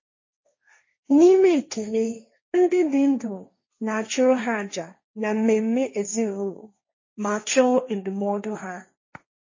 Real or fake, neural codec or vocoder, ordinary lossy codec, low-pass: fake; codec, 16 kHz, 1.1 kbps, Voila-Tokenizer; MP3, 32 kbps; 7.2 kHz